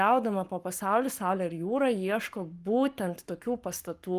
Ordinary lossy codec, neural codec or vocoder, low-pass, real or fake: Opus, 32 kbps; codec, 44.1 kHz, 7.8 kbps, Pupu-Codec; 14.4 kHz; fake